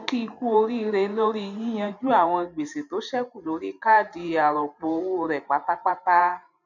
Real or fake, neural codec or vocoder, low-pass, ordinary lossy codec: fake; vocoder, 44.1 kHz, 128 mel bands every 512 samples, BigVGAN v2; 7.2 kHz; none